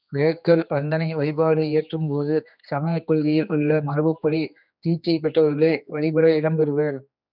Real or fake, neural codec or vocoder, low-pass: fake; codec, 16 kHz, 2 kbps, X-Codec, HuBERT features, trained on general audio; 5.4 kHz